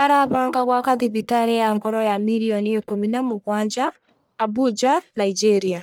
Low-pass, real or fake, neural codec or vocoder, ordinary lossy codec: none; fake; codec, 44.1 kHz, 1.7 kbps, Pupu-Codec; none